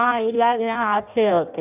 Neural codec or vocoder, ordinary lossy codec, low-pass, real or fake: codec, 16 kHz in and 24 kHz out, 0.6 kbps, FireRedTTS-2 codec; none; 3.6 kHz; fake